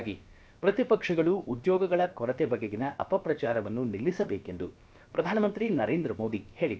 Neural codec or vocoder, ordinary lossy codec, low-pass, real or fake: codec, 16 kHz, about 1 kbps, DyCAST, with the encoder's durations; none; none; fake